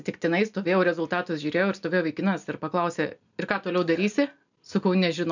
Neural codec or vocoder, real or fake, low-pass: none; real; 7.2 kHz